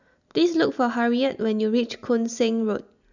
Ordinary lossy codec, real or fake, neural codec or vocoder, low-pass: none; real; none; 7.2 kHz